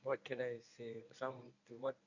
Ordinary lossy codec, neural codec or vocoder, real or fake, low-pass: none; codec, 24 kHz, 0.9 kbps, WavTokenizer, medium speech release version 1; fake; 7.2 kHz